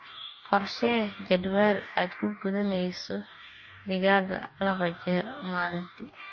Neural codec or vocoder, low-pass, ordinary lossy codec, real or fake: codec, 44.1 kHz, 2.6 kbps, DAC; 7.2 kHz; MP3, 32 kbps; fake